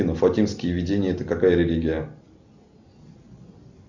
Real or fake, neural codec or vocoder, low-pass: real; none; 7.2 kHz